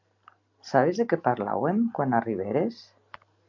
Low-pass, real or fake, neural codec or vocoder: 7.2 kHz; real; none